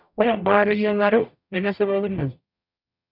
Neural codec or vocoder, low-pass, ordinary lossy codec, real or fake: codec, 44.1 kHz, 0.9 kbps, DAC; 5.4 kHz; none; fake